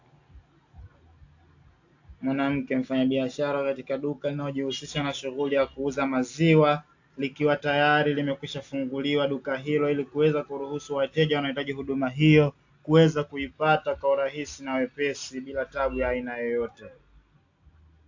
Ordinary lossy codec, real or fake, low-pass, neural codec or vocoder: AAC, 48 kbps; real; 7.2 kHz; none